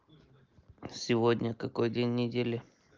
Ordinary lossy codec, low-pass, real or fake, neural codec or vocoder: Opus, 24 kbps; 7.2 kHz; real; none